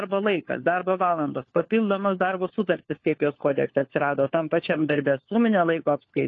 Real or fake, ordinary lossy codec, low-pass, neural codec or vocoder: fake; AAC, 32 kbps; 7.2 kHz; codec, 16 kHz, 4 kbps, FunCodec, trained on LibriTTS, 50 frames a second